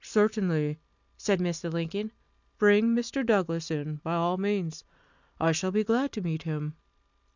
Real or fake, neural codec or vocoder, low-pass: real; none; 7.2 kHz